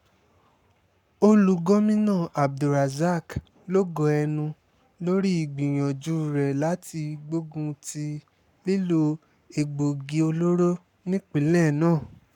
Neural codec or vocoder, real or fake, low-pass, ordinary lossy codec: codec, 44.1 kHz, 7.8 kbps, Pupu-Codec; fake; 19.8 kHz; none